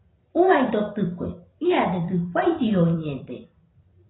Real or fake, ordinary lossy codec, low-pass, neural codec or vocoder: fake; AAC, 16 kbps; 7.2 kHz; vocoder, 44.1 kHz, 128 mel bands every 256 samples, BigVGAN v2